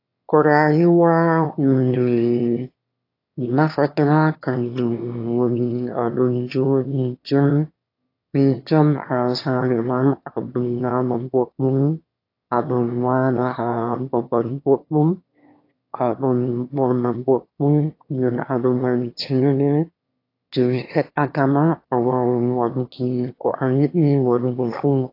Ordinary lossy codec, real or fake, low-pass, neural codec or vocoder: AAC, 32 kbps; fake; 5.4 kHz; autoencoder, 22.05 kHz, a latent of 192 numbers a frame, VITS, trained on one speaker